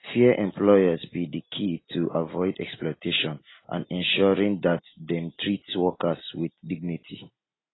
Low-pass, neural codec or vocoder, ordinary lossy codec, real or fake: 7.2 kHz; none; AAC, 16 kbps; real